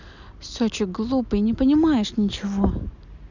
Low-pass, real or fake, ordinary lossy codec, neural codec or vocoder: 7.2 kHz; real; none; none